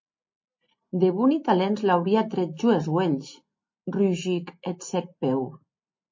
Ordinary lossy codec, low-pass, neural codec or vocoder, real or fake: MP3, 32 kbps; 7.2 kHz; none; real